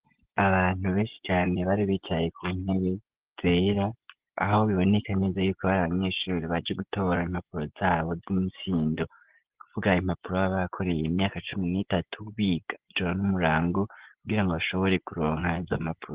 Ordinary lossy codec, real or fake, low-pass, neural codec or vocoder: Opus, 16 kbps; fake; 3.6 kHz; vocoder, 24 kHz, 100 mel bands, Vocos